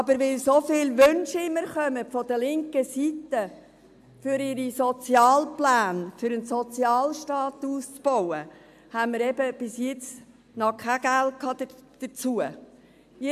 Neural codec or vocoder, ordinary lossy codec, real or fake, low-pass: none; AAC, 96 kbps; real; 14.4 kHz